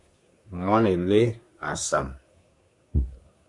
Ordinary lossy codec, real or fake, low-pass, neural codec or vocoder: MP3, 64 kbps; fake; 10.8 kHz; codec, 24 kHz, 1 kbps, SNAC